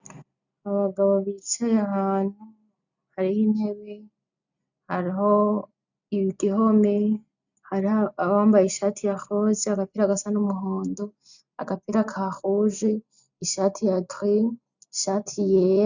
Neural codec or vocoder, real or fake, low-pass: none; real; 7.2 kHz